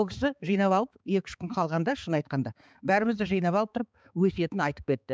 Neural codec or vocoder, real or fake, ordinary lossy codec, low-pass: codec, 16 kHz, 4 kbps, X-Codec, HuBERT features, trained on balanced general audio; fake; none; none